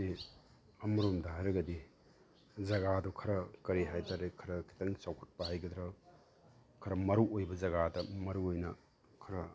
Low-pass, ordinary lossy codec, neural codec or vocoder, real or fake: none; none; none; real